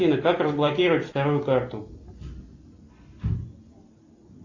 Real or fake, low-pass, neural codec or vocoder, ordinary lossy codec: real; 7.2 kHz; none; AAC, 48 kbps